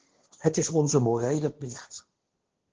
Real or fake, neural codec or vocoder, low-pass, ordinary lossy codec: fake; codec, 16 kHz, 1.1 kbps, Voila-Tokenizer; 7.2 kHz; Opus, 16 kbps